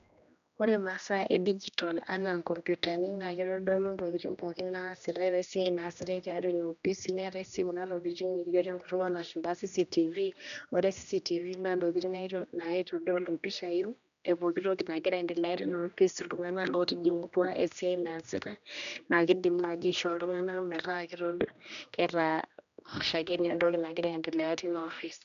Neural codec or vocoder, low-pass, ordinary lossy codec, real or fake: codec, 16 kHz, 1 kbps, X-Codec, HuBERT features, trained on general audio; 7.2 kHz; none; fake